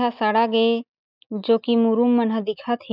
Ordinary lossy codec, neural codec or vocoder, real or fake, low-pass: none; none; real; 5.4 kHz